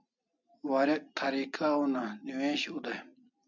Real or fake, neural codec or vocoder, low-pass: real; none; 7.2 kHz